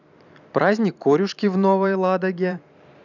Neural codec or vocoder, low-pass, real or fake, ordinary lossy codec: vocoder, 44.1 kHz, 128 mel bands every 512 samples, BigVGAN v2; 7.2 kHz; fake; none